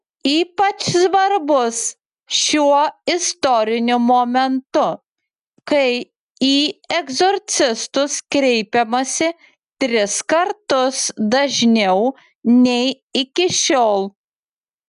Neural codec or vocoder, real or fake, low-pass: none; real; 10.8 kHz